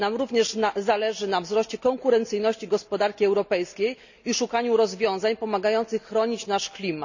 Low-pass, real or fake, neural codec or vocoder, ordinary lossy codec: 7.2 kHz; real; none; none